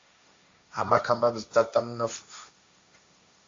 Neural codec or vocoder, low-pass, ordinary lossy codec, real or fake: codec, 16 kHz, 1.1 kbps, Voila-Tokenizer; 7.2 kHz; AAC, 48 kbps; fake